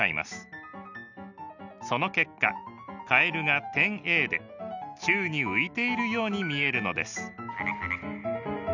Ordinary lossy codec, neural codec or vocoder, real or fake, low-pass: none; none; real; 7.2 kHz